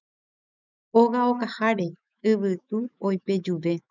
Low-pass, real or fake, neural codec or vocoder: 7.2 kHz; fake; autoencoder, 48 kHz, 128 numbers a frame, DAC-VAE, trained on Japanese speech